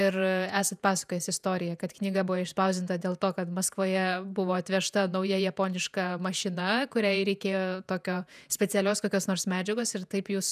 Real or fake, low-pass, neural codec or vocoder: fake; 14.4 kHz; vocoder, 48 kHz, 128 mel bands, Vocos